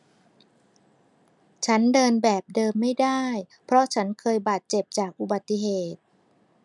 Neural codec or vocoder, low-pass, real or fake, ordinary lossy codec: none; 10.8 kHz; real; none